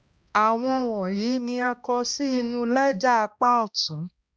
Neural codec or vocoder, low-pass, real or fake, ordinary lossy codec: codec, 16 kHz, 1 kbps, X-Codec, HuBERT features, trained on balanced general audio; none; fake; none